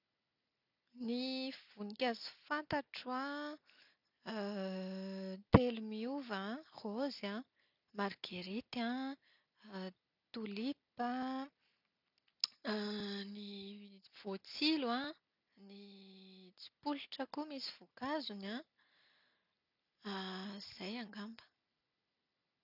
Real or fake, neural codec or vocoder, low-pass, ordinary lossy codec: real; none; 5.4 kHz; none